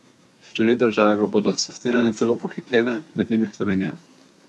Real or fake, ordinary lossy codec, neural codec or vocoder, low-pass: fake; none; codec, 24 kHz, 1 kbps, SNAC; none